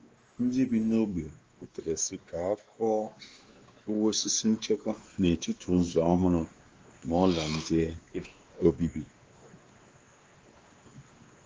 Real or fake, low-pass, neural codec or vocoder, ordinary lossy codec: fake; 7.2 kHz; codec, 16 kHz, 2 kbps, X-Codec, WavLM features, trained on Multilingual LibriSpeech; Opus, 24 kbps